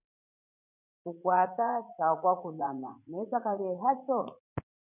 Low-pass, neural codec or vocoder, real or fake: 3.6 kHz; vocoder, 44.1 kHz, 80 mel bands, Vocos; fake